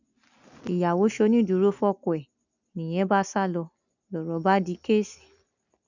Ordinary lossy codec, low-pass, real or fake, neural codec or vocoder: none; 7.2 kHz; real; none